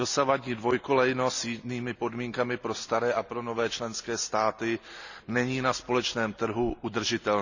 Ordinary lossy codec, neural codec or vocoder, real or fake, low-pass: none; none; real; 7.2 kHz